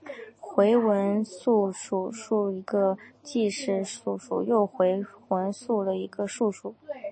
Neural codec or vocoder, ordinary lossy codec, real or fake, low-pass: none; MP3, 32 kbps; real; 9.9 kHz